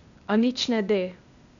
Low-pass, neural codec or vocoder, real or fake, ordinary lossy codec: 7.2 kHz; codec, 16 kHz, 0.8 kbps, ZipCodec; fake; none